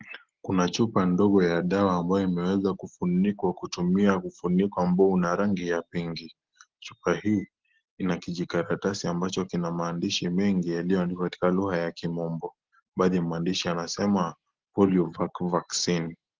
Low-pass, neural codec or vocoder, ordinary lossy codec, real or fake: 7.2 kHz; none; Opus, 16 kbps; real